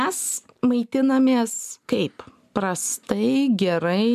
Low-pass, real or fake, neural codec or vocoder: 14.4 kHz; real; none